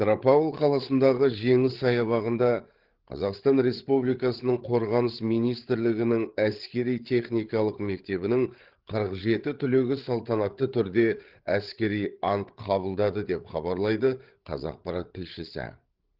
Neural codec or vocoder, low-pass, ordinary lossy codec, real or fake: codec, 16 kHz, 8 kbps, FreqCodec, larger model; 5.4 kHz; Opus, 16 kbps; fake